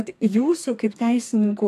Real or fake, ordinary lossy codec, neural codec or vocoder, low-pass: fake; AAC, 64 kbps; codec, 32 kHz, 1.9 kbps, SNAC; 14.4 kHz